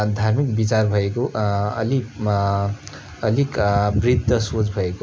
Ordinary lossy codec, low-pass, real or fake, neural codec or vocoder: none; none; real; none